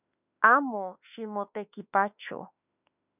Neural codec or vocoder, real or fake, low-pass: autoencoder, 48 kHz, 32 numbers a frame, DAC-VAE, trained on Japanese speech; fake; 3.6 kHz